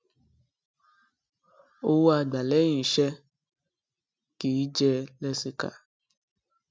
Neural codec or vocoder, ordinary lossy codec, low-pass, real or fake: none; none; none; real